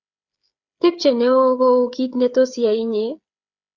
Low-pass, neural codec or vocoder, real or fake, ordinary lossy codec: 7.2 kHz; codec, 16 kHz, 8 kbps, FreqCodec, smaller model; fake; Opus, 64 kbps